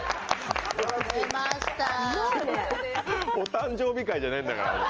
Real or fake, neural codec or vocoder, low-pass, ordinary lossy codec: real; none; 7.2 kHz; Opus, 24 kbps